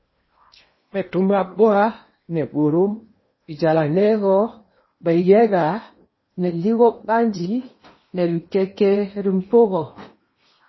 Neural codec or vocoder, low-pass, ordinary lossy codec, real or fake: codec, 16 kHz in and 24 kHz out, 0.8 kbps, FocalCodec, streaming, 65536 codes; 7.2 kHz; MP3, 24 kbps; fake